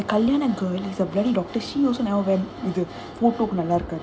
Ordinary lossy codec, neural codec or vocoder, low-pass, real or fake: none; none; none; real